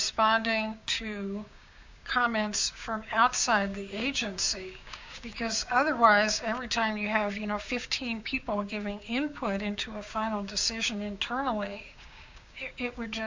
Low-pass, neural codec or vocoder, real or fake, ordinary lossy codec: 7.2 kHz; codec, 16 kHz, 6 kbps, DAC; fake; MP3, 64 kbps